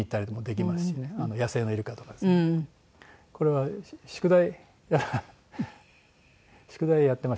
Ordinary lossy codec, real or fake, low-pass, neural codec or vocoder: none; real; none; none